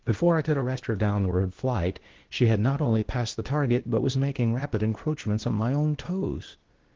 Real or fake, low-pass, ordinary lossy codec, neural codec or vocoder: fake; 7.2 kHz; Opus, 16 kbps; codec, 16 kHz, 0.8 kbps, ZipCodec